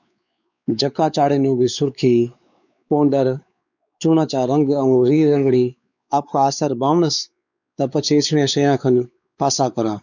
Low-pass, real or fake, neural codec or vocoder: 7.2 kHz; fake; codec, 16 kHz, 4 kbps, X-Codec, WavLM features, trained on Multilingual LibriSpeech